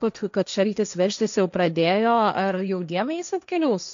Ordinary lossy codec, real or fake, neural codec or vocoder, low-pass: MP3, 64 kbps; fake; codec, 16 kHz, 1.1 kbps, Voila-Tokenizer; 7.2 kHz